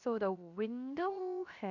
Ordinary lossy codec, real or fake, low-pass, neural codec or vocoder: none; fake; 7.2 kHz; codec, 16 kHz, 0.7 kbps, FocalCodec